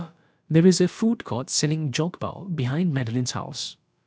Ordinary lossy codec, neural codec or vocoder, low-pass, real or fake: none; codec, 16 kHz, about 1 kbps, DyCAST, with the encoder's durations; none; fake